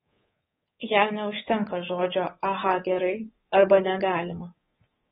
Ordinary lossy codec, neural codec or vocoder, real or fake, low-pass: AAC, 16 kbps; codec, 24 kHz, 3.1 kbps, DualCodec; fake; 10.8 kHz